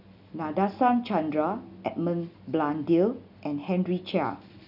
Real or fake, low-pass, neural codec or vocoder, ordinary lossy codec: real; 5.4 kHz; none; none